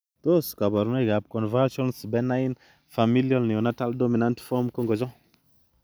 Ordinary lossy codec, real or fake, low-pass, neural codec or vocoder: none; real; none; none